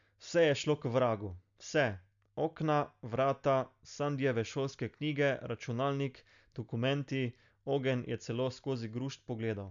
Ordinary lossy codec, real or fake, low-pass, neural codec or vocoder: none; real; 7.2 kHz; none